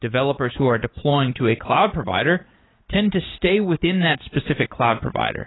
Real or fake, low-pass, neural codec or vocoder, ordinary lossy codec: fake; 7.2 kHz; vocoder, 44.1 kHz, 80 mel bands, Vocos; AAC, 16 kbps